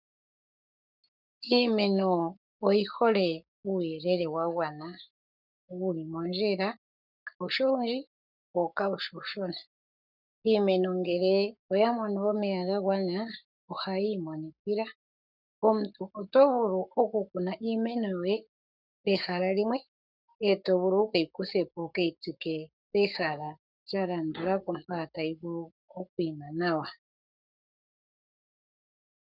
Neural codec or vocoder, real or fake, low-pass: codec, 44.1 kHz, 7.8 kbps, Pupu-Codec; fake; 5.4 kHz